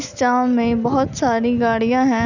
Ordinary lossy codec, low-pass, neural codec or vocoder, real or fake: none; 7.2 kHz; none; real